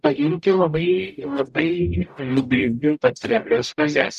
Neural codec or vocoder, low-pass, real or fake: codec, 44.1 kHz, 0.9 kbps, DAC; 14.4 kHz; fake